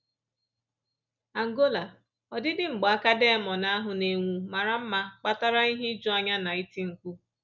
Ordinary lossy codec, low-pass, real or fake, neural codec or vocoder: none; 7.2 kHz; real; none